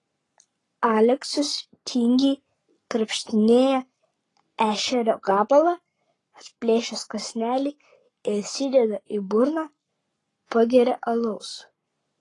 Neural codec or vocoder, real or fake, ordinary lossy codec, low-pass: codec, 44.1 kHz, 7.8 kbps, Pupu-Codec; fake; AAC, 32 kbps; 10.8 kHz